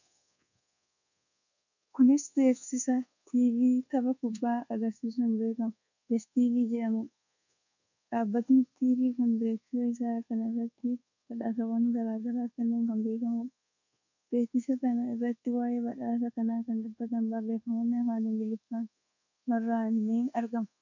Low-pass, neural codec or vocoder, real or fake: 7.2 kHz; codec, 24 kHz, 1.2 kbps, DualCodec; fake